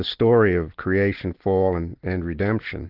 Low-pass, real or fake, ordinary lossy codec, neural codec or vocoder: 5.4 kHz; real; Opus, 16 kbps; none